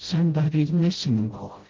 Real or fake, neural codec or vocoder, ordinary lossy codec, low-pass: fake; codec, 16 kHz, 0.5 kbps, FreqCodec, smaller model; Opus, 16 kbps; 7.2 kHz